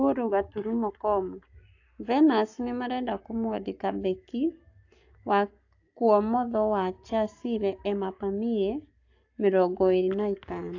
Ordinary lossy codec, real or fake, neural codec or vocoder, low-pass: AAC, 48 kbps; fake; codec, 44.1 kHz, 7.8 kbps, Pupu-Codec; 7.2 kHz